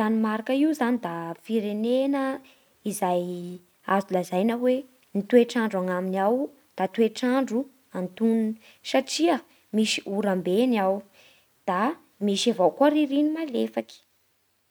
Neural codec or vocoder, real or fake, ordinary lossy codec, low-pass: none; real; none; none